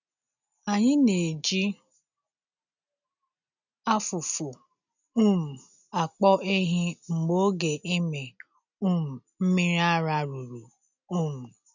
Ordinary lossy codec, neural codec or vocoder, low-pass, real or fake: none; none; 7.2 kHz; real